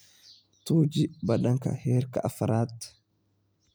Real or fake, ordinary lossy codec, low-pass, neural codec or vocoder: real; none; none; none